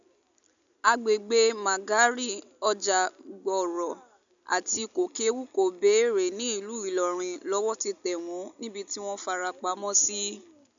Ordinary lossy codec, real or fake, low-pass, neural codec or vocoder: none; real; 7.2 kHz; none